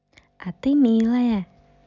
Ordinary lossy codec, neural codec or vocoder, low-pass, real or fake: none; none; 7.2 kHz; real